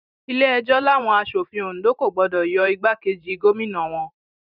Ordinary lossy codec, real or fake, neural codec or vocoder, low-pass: none; fake; vocoder, 44.1 kHz, 128 mel bands every 512 samples, BigVGAN v2; 5.4 kHz